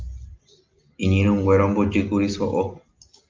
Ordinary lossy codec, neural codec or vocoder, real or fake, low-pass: Opus, 24 kbps; none; real; 7.2 kHz